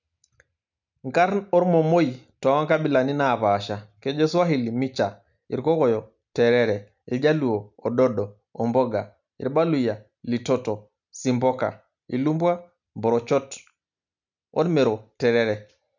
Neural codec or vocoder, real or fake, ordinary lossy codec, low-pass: none; real; none; 7.2 kHz